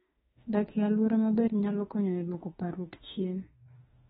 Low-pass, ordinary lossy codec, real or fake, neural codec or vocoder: 19.8 kHz; AAC, 16 kbps; fake; autoencoder, 48 kHz, 32 numbers a frame, DAC-VAE, trained on Japanese speech